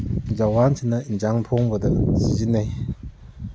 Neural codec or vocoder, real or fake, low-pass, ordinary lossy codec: none; real; none; none